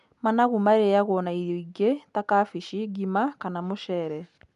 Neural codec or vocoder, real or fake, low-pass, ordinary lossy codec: none; real; 10.8 kHz; none